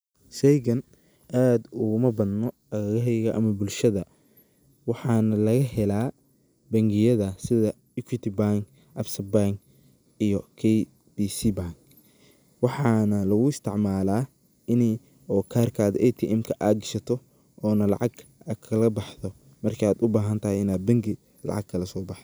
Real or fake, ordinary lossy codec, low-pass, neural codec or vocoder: real; none; none; none